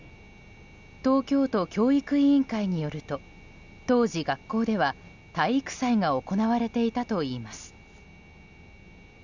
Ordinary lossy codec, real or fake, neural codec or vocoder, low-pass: none; real; none; 7.2 kHz